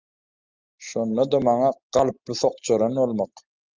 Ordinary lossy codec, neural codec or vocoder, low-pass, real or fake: Opus, 24 kbps; none; 7.2 kHz; real